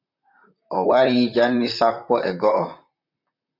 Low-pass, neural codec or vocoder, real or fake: 5.4 kHz; vocoder, 44.1 kHz, 128 mel bands, Pupu-Vocoder; fake